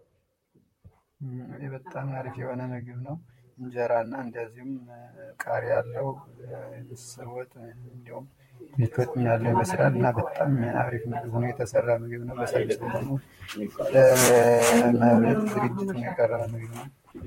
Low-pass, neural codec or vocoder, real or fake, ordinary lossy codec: 19.8 kHz; vocoder, 44.1 kHz, 128 mel bands, Pupu-Vocoder; fake; MP3, 64 kbps